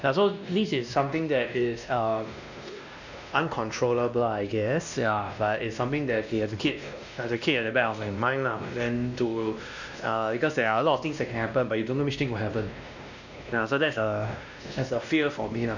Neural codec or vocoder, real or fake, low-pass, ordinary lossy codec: codec, 16 kHz, 1 kbps, X-Codec, WavLM features, trained on Multilingual LibriSpeech; fake; 7.2 kHz; none